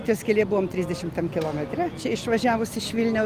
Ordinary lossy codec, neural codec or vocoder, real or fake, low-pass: Opus, 24 kbps; none; real; 14.4 kHz